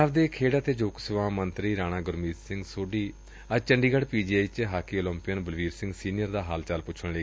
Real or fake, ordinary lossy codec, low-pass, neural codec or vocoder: real; none; none; none